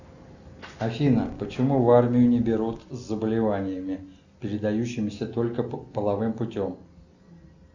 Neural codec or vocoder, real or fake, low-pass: none; real; 7.2 kHz